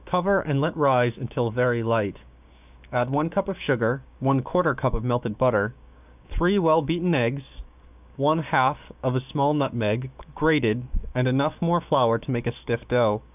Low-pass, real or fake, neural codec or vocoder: 3.6 kHz; fake; codec, 16 kHz, 6 kbps, DAC